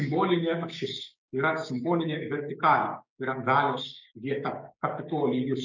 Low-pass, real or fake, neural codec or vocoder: 7.2 kHz; fake; codec, 16 kHz, 6 kbps, DAC